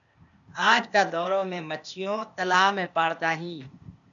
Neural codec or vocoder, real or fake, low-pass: codec, 16 kHz, 0.8 kbps, ZipCodec; fake; 7.2 kHz